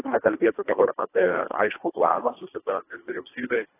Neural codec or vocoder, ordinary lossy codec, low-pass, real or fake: codec, 24 kHz, 1.5 kbps, HILCodec; AAC, 16 kbps; 3.6 kHz; fake